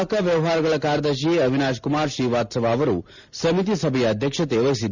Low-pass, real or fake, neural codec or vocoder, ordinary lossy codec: 7.2 kHz; real; none; none